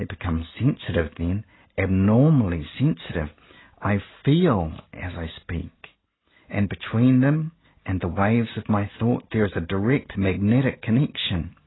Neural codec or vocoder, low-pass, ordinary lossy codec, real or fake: none; 7.2 kHz; AAC, 16 kbps; real